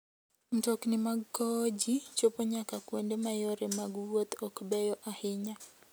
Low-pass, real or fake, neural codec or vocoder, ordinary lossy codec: none; real; none; none